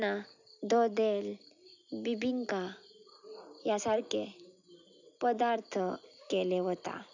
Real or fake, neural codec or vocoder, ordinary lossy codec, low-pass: real; none; none; 7.2 kHz